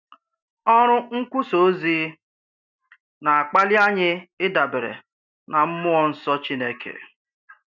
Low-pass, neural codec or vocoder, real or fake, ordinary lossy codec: 7.2 kHz; none; real; none